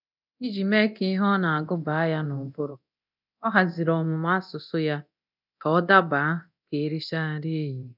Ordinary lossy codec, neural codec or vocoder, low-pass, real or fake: none; codec, 24 kHz, 0.9 kbps, DualCodec; 5.4 kHz; fake